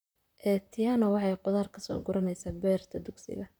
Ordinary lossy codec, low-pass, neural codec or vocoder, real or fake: none; none; vocoder, 44.1 kHz, 128 mel bands, Pupu-Vocoder; fake